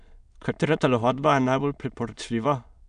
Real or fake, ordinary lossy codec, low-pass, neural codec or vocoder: fake; none; 9.9 kHz; autoencoder, 22.05 kHz, a latent of 192 numbers a frame, VITS, trained on many speakers